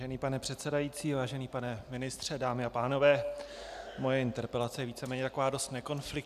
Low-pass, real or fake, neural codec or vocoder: 14.4 kHz; real; none